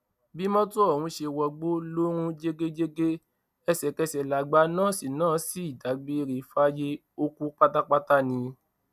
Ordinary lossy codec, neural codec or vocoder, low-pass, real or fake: none; none; 14.4 kHz; real